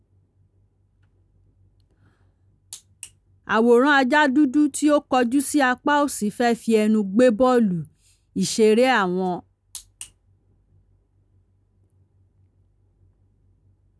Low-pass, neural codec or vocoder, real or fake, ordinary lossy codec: none; none; real; none